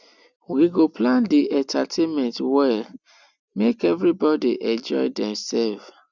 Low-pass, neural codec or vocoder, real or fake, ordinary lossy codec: 7.2 kHz; none; real; none